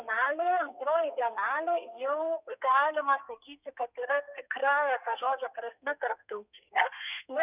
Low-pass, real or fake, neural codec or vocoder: 3.6 kHz; fake; codec, 32 kHz, 1.9 kbps, SNAC